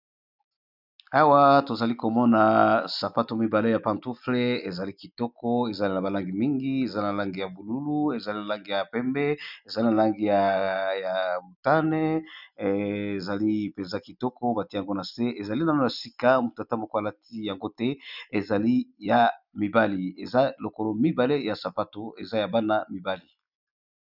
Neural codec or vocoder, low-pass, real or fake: none; 5.4 kHz; real